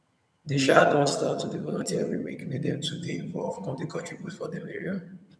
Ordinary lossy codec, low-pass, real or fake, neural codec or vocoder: none; none; fake; vocoder, 22.05 kHz, 80 mel bands, HiFi-GAN